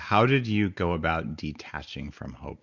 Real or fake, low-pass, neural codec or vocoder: real; 7.2 kHz; none